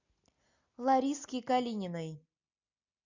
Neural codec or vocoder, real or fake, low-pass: none; real; 7.2 kHz